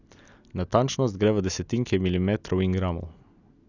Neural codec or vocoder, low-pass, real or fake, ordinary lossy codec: none; 7.2 kHz; real; none